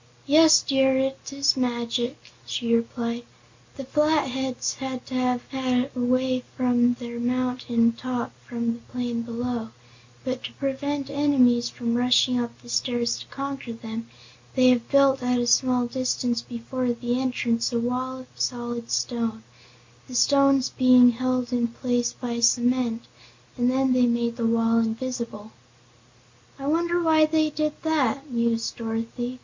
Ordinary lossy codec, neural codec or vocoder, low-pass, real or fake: MP3, 48 kbps; none; 7.2 kHz; real